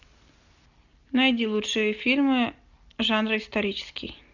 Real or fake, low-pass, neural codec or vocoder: real; 7.2 kHz; none